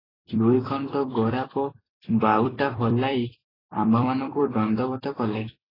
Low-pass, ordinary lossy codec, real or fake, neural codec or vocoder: 5.4 kHz; AAC, 24 kbps; fake; vocoder, 44.1 kHz, 128 mel bands every 256 samples, BigVGAN v2